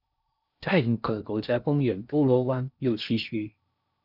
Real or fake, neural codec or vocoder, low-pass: fake; codec, 16 kHz in and 24 kHz out, 0.6 kbps, FocalCodec, streaming, 4096 codes; 5.4 kHz